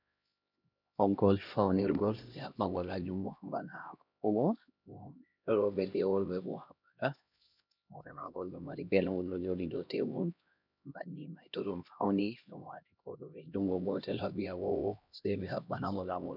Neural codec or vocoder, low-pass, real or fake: codec, 16 kHz, 1 kbps, X-Codec, HuBERT features, trained on LibriSpeech; 5.4 kHz; fake